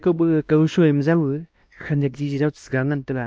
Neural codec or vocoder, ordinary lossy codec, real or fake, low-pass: codec, 16 kHz, 1 kbps, X-Codec, WavLM features, trained on Multilingual LibriSpeech; none; fake; none